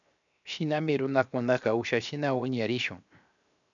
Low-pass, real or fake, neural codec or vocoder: 7.2 kHz; fake; codec, 16 kHz, 0.7 kbps, FocalCodec